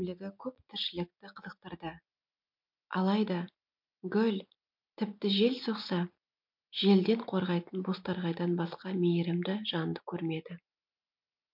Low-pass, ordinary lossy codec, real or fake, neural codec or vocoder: 5.4 kHz; MP3, 48 kbps; real; none